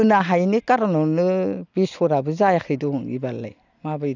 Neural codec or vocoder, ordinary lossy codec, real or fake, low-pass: none; none; real; 7.2 kHz